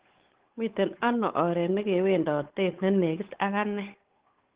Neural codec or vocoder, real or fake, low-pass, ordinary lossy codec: codec, 16 kHz, 8 kbps, FunCodec, trained on Chinese and English, 25 frames a second; fake; 3.6 kHz; Opus, 16 kbps